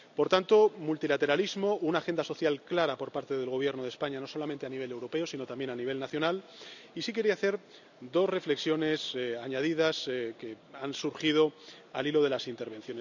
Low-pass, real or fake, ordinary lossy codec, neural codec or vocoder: 7.2 kHz; real; none; none